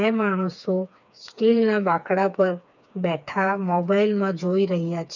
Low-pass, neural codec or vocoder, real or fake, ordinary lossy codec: 7.2 kHz; codec, 16 kHz, 4 kbps, FreqCodec, smaller model; fake; none